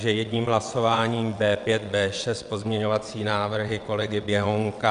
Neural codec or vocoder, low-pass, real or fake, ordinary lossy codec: vocoder, 22.05 kHz, 80 mel bands, WaveNeXt; 9.9 kHz; fake; MP3, 96 kbps